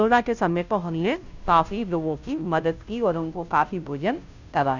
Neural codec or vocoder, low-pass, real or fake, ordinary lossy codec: codec, 16 kHz, 0.5 kbps, FunCodec, trained on Chinese and English, 25 frames a second; 7.2 kHz; fake; none